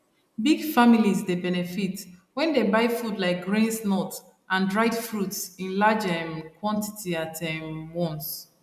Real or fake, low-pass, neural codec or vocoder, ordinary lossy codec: real; 14.4 kHz; none; none